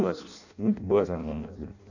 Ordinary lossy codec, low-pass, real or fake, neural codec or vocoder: none; 7.2 kHz; fake; codec, 16 kHz in and 24 kHz out, 0.6 kbps, FireRedTTS-2 codec